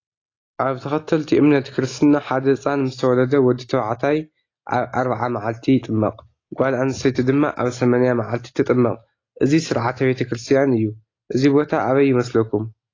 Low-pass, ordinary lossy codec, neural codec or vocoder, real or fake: 7.2 kHz; AAC, 32 kbps; none; real